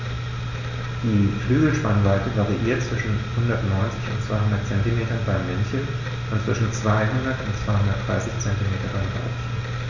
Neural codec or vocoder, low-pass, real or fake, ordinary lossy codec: none; 7.2 kHz; real; none